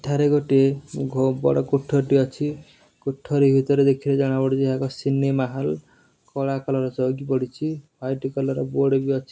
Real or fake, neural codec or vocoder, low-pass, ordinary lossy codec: real; none; none; none